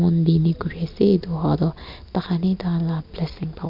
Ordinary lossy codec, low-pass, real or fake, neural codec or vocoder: none; 5.4 kHz; real; none